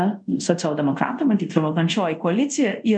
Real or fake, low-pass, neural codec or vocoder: fake; 9.9 kHz; codec, 24 kHz, 0.5 kbps, DualCodec